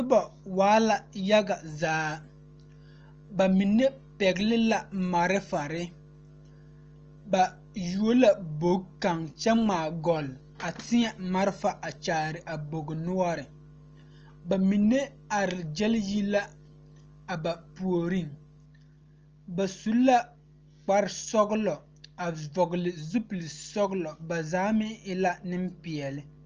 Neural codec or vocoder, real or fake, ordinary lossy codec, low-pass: none; real; Opus, 24 kbps; 7.2 kHz